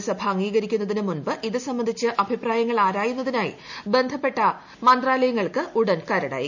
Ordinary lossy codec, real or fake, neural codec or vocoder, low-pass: none; real; none; 7.2 kHz